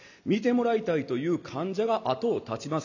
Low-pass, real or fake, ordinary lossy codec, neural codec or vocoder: 7.2 kHz; real; none; none